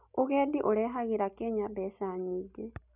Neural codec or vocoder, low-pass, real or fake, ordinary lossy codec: none; 3.6 kHz; real; none